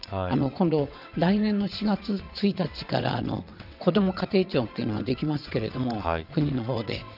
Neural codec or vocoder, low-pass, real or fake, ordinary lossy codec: vocoder, 22.05 kHz, 80 mel bands, WaveNeXt; 5.4 kHz; fake; none